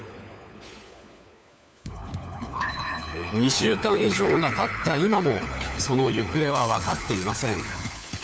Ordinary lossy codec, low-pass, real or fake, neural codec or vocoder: none; none; fake; codec, 16 kHz, 4 kbps, FunCodec, trained on LibriTTS, 50 frames a second